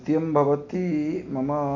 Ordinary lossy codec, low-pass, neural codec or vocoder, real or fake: none; 7.2 kHz; none; real